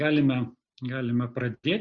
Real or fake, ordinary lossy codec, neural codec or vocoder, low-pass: real; Opus, 64 kbps; none; 7.2 kHz